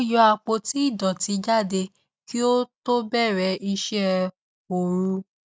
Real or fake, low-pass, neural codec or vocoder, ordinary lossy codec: real; none; none; none